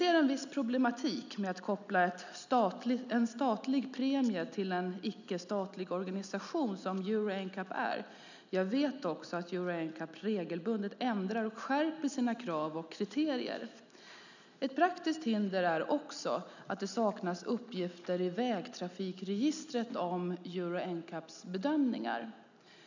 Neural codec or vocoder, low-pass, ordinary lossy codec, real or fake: none; 7.2 kHz; none; real